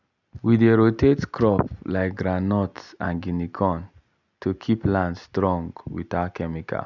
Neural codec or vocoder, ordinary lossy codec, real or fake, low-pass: none; none; real; 7.2 kHz